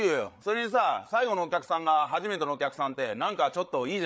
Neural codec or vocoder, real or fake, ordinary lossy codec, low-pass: codec, 16 kHz, 8 kbps, FreqCodec, larger model; fake; none; none